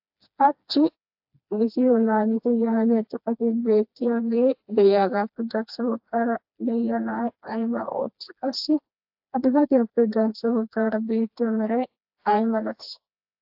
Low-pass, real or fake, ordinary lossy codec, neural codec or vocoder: 5.4 kHz; fake; AAC, 48 kbps; codec, 16 kHz, 2 kbps, FreqCodec, smaller model